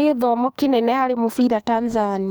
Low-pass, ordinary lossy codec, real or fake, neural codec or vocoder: none; none; fake; codec, 44.1 kHz, 2.6 kbps, SNAC